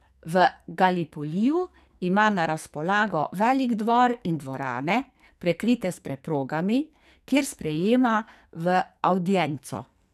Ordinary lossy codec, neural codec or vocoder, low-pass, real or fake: none; codec, 44.1 kHz, 2.6 kbps, SNAC; 14.4 kHz; fake